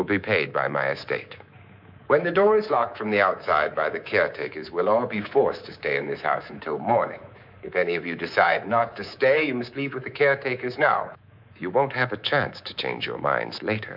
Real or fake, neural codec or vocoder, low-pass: fake; codec, 24 kHz, 3.1 kbps, DualCodec; 5.4 kHz